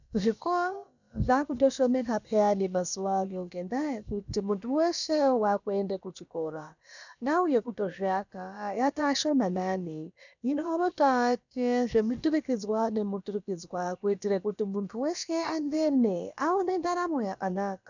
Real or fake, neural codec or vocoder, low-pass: fake; codec, 16 kHz, about 1 kbps, DyCAST, with the encoder's durations; 7.2 kHz